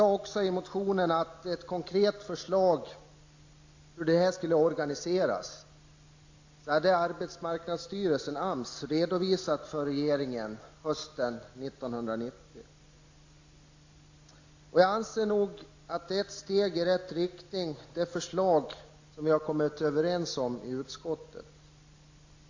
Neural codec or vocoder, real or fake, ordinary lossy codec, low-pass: none; real; AAC, 48 kbps; 7.2 kHz